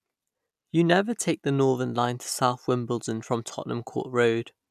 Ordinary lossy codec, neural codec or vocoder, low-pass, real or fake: none; none; 14.4 kHz; real